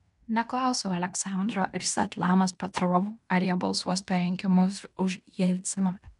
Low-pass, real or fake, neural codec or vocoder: 10.8 kHz; fake; codec, 16 kHz in and 24 kHz out, 0.9 kbps, LongCat-Audio-Codec, fine tuned four codebook decoder